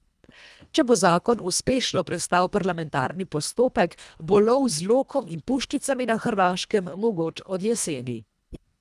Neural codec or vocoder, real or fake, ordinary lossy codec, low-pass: codec, 24 kHz, 1.5 kbps, HILCodec; fake; none; none